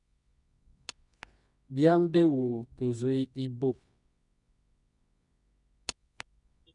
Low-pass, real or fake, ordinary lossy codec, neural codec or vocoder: 10.8 kHz; fake; none; codec, 24 kHz, 0.9 kbps, WavTokenizer, medium music audio release